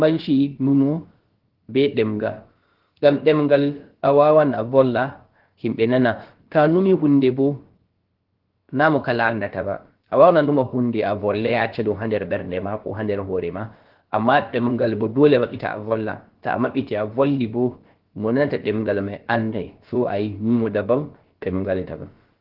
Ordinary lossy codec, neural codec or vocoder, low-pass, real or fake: Opus, 16 kbps; codec, 16 kHz, about 1 kbps, DyCAST, with the encoder's durations; 5.4 kHz; fake